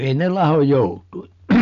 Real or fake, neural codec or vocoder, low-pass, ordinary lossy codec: real; none; 7.2 kHz; none